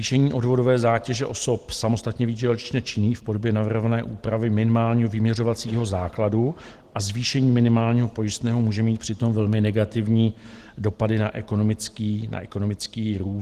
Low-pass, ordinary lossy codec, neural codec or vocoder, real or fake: 14.4 kHz; Opus, 16 kbps; none; real